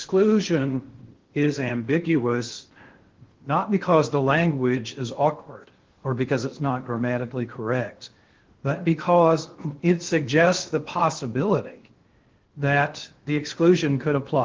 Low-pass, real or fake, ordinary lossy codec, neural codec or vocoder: 7.2 kHz; fake; Opus, 16 kbps; codec, 16 kHz in and 24 kHz out, 0.6 kbps, FocalCodec, streaming, 2048 codes